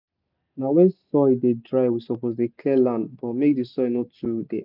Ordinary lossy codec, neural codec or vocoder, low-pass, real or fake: none; none; 5.4 kHz; real